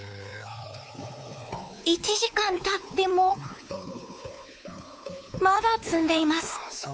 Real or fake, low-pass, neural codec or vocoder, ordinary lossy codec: fake; none; codec, 16 kHz, 4 kbps, X-Codec, WavLM features, trained on Multilingual LibriSpeech; none